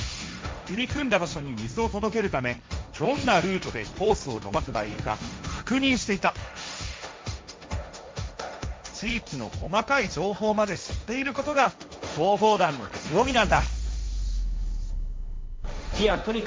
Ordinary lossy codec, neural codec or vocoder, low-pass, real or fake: none; codec, 16 kHz, 1.1 kbps, Voila-Tokenizer; none; fake